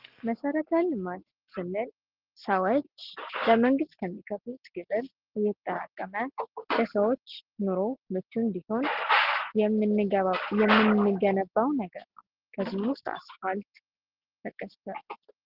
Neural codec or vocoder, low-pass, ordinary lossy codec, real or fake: none; 5.4 kHz; Opus, 16 kbps; real